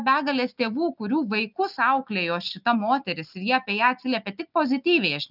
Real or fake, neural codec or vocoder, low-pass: real; none; 5.4 kHz